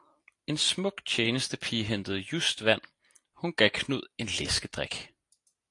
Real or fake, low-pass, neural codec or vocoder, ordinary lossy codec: real; 10.8 kHz; none; AAC, 48 kbps